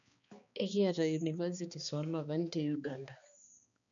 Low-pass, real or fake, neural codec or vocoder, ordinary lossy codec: 7.2 kHz; fake; codec, 16 kHz, 2 kbps, X-Codec, HuBERT features, trained on balanced general audio; none